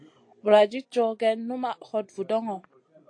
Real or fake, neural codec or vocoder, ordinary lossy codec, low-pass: real; none; AAC, 48 kbps; 9.9 kHz